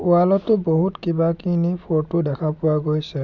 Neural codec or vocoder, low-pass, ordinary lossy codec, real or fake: none; 7.2 kHz; none; real